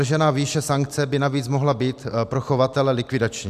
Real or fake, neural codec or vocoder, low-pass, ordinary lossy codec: real; none; 14.4 kHz; AAC, 96 kbps